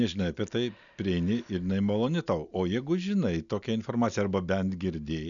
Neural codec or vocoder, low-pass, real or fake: none; 7.2 kHz; real